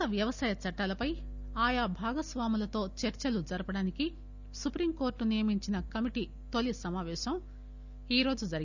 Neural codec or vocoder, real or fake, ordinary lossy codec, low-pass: none; real; none; 7.2 kHz